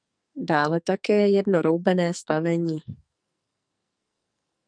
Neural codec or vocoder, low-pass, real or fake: codec, 44.1 kHz, 2.6 kbps, SNAC; 9.9 kHz; fake